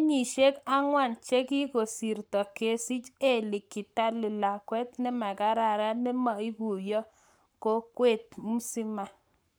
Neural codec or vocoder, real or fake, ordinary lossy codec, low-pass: codec, 44.1 kHz, 7.8 kbps, Pupu-Codec; fake; none; none